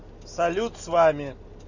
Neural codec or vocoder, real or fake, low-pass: none; real; 7.2 kHz